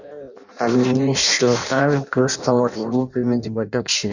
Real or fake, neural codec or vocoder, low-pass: fake; codec, 16 kHz in and 24 kHz out, 0.6 kbps, FireRedTTS-2 codec; 7.2 kHz